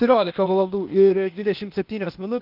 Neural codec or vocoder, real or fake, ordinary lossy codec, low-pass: codec, 16 kHz, 0.8 kbps, ZipCodec; fake; Opus, 24 kbps; 5.4 kHz